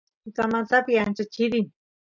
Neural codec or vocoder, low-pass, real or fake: none; 7.2 kHz; real